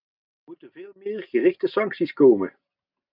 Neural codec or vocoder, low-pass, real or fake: none; 5.4 kHz; real